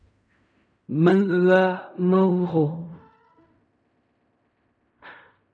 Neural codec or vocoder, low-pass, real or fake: codec, 16 kHz in and 24 kHz out, 0.4 kbps, LongCat-Audio-Codec, fine tuned four codebook decoder; 9.9 kHz; fake